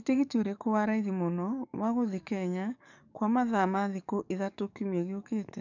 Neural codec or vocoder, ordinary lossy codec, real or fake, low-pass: codec, 44.1 kHz, 7.8 kbps, DAC; none; fake; 7.2 kHz